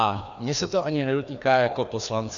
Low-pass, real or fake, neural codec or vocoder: 7.2 kHz; fake; codec, 16 kHz, 2 kbps, FreqCodec, larger model